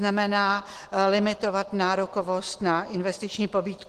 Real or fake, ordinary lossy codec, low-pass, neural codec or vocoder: real; Opus, 16 kbps; 14.4 kHz; none